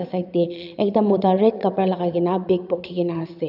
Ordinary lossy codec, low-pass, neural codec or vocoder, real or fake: none; 5.4 kHz; vocoder, 22.05 kHz, 80 mel bands, Vocos; fake